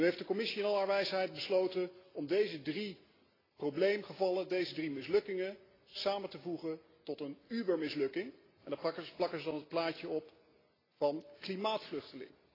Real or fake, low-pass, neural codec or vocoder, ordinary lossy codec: real; 5.4 kHz; none; AAC, 24 kbps